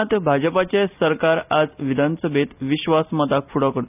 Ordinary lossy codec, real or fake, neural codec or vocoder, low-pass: none; real; none; 3.6 kHz